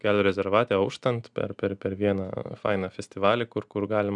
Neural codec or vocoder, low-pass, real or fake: none; 10.8 kHz; real